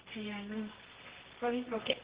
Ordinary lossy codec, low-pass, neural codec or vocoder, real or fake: Opus, 16 kbps; 3.6 kHz; codec, 24 kHz, 0.9 kbps, WavTokenizer, medium music audio release; fake